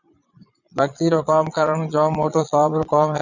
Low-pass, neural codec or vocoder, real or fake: 7.2 kHz; vocoder, 22.05 kHz, 80 mel bands, Vocos; fake